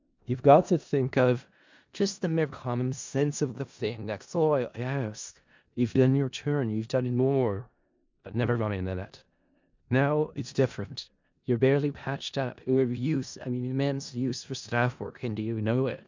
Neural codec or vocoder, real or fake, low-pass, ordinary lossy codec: codec, 16 kHz in and 24 kHz out, 0.4 kbps, LongCat-Audio-Codec, four codebook decoder; fake; 7.2 kHz; MP3, 64 kbps